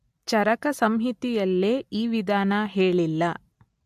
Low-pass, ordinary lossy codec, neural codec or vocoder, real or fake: 14.4 kHz; MP3, 64 kbps; none; real